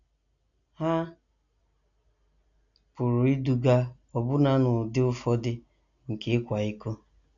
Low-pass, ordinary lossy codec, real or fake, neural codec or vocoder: 7.2 kHz; none; real; none